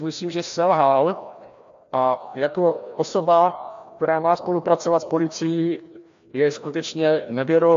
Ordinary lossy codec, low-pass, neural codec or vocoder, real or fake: AAC, 64 kbps; 7.2 kHz; codec, 16 kHz, 1 kbps, FreqCodec, larger model; fake